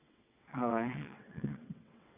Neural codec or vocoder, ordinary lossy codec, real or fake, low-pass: vocoder, 22.05 kHz, 80 mel bands, WaveNeXt; AAC, 32 kbps; fake; 3.6 kHz